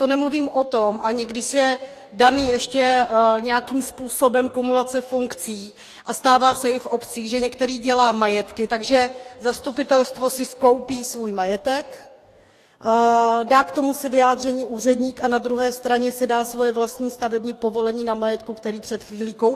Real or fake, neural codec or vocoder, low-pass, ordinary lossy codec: fake; codec, 44.1 kHz, 2.6 kbps, DAC; 14.4 kHz; AAC, 64 kbps